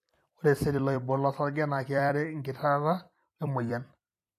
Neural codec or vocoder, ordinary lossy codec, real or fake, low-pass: vocoder, 44.1 kHz, 128 mel bands every 256 samples, BigVGAN v2; AAC, 64 kbps; fake; 14.4 kHz